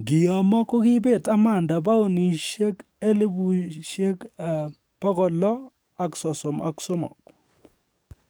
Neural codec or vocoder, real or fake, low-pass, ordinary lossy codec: codec, 44.1 kHz, 7.8 kbps, DAC; fake; none; none